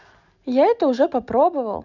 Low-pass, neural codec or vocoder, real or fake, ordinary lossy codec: 7.2 kHz; none; real; none